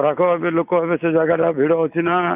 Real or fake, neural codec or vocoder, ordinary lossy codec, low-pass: real; none; none; 3.6 kHz